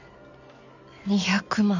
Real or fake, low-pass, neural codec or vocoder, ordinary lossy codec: real; 7.2 kHz; none; none